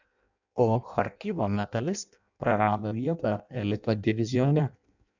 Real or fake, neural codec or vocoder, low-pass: fake; codec, 16 kHz in and 24 kHz out, 0.6 kbps, FireRedTTS-2 codec; 7.2 kHz